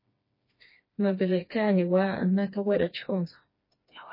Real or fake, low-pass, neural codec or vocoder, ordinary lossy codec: fake; 5.4 kHz; codec, 16 kHz, 2 kbps, FreqCodec, smaller model; MP3, 24 kbps